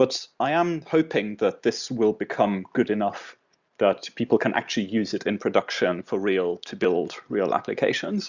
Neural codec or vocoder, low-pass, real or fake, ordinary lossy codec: none; 7.2 kHz; real; Opus, 64 kbps